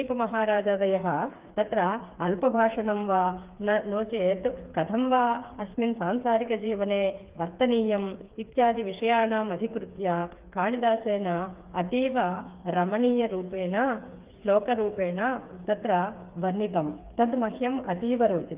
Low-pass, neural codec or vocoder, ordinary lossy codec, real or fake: 3.6 kHz; codec, 16 kHz, 4 kbps, FreqCodec, smaller model; Opus, 32 kbps; fake